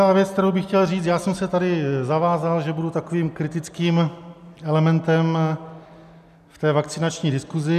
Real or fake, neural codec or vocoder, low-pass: real; none; 14.4 kHz